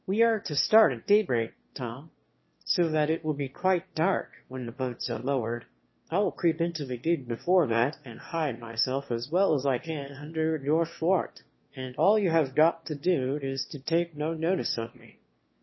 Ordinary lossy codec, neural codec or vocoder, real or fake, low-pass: MP3, 24 kbps; autoencoder, 22.05 kHz, a latent of 192 numbers a frame, VITS, trained on one speaker; fake; 7.2 kHz